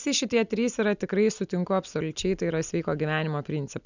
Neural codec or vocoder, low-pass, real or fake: none; 7.2 kHz; real